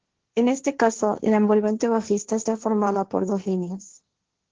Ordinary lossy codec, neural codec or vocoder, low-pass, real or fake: Opus, 16 kbps; codec, 16 kHz, 1.1 kbps, Voila-Tokenizer; 7.2 kHz; fake